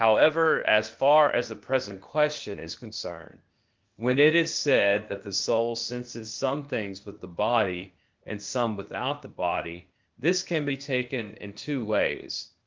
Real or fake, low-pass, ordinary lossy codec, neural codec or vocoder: fake; 7.2 kHz; Opus, 32 kbps; codec, 16 kHz, 0.8 kbps, ZipCodec